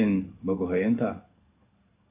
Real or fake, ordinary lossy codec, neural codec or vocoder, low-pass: fake; AAC, 24 kbps; codec, 16 kHz in and 24 kHz out, 1 kbps, XY-Tokenizer; 3.6 kHz